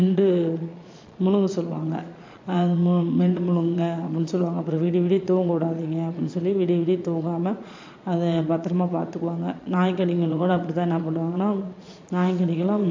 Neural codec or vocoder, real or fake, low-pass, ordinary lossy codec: vocoder, 22.05 kHz, 80 mel bands, Vocos; fake; 7.2 kHz; MP3, 64 kbps